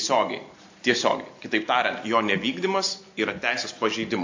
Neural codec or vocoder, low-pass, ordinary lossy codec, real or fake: none; 7.2 kHz; AAC, 48 kbps; real